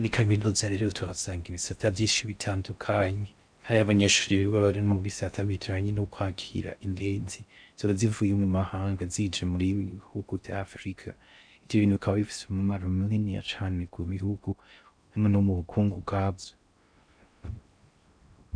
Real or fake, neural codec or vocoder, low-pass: fake; codec, 16 kHz in and 24 kHz out, 0.6 kbps, FocalCodec, streaming, 4096 codes; 9.9 kHz